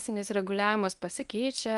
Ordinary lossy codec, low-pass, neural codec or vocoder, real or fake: Opus, 32 kbps; 10.8 kHz; codec, 24 kHz, 0.9 kbps, WavTokenizer, medium speech release version 1; fake